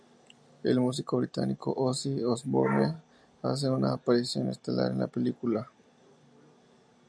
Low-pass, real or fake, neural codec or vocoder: 9.9 kHz; real; none